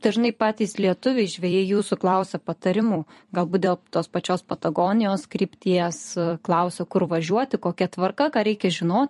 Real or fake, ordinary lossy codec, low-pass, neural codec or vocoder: fake; MP3, 48 kbps; 14.4 kHz; vocoder, 44.1 kHz, 128 mel bands every 256 samples, BigVGAN v2